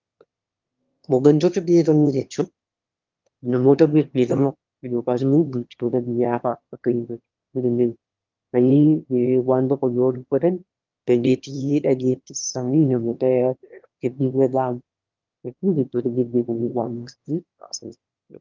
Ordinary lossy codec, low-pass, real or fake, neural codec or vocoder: Opus, 32 kbps; 7.2 kHz; fake; autoencoder, 22.05 kHz, a latent of 192 numbers a frame, VITS, trained on one speaker